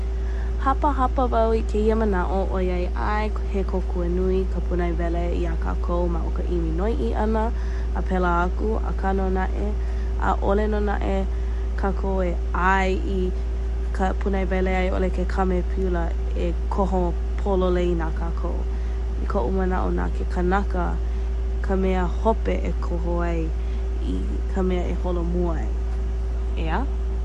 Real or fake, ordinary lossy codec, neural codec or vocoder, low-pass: real; MP3, 48 kbps; none; 14.4 kHz